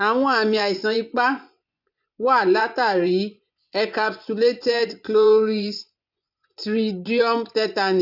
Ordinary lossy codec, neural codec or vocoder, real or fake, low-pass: none; vocoder, 24 kHz, 100 mel bands, Vocos; fake; 5.4 kHz